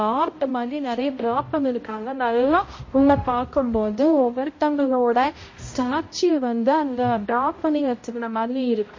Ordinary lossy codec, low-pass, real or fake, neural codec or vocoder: MP3, 32 kbps; 7.2 kHz; fake; codec, 16 kHz, 0.5 kbps, X-Codec, HuBERT features, trained on balanced general audio